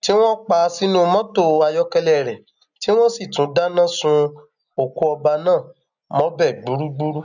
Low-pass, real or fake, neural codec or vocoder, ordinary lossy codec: 7.2 kHz; real; none; none